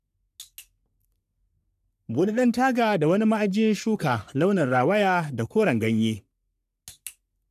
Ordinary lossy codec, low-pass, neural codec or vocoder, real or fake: none; 14.4 kHz; codec, 44.1 kHz, 3.4 kbps, Pupu-Codec; fake